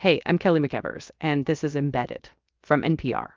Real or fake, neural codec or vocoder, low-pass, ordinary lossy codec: fake; codec, 16 kHz, 0.7 kbps, FocalCodec; 7.2 kHz; Opus, 24 kbps